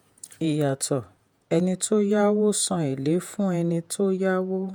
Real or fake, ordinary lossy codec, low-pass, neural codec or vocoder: fake; none; none; vocoder, 48 kHz, 128 mel bands, Vocos